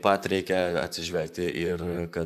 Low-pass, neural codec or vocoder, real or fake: 14.4 kHz; vocoder, 44.1 kHz, 128 mel bands, Pupu-Vocoder; fake